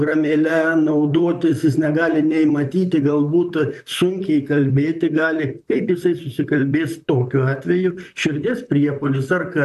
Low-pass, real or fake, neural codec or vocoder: 14.4 kHz; fake; vocoder, 44.1 kHz, 128 mel bands, Pupu-Vocoder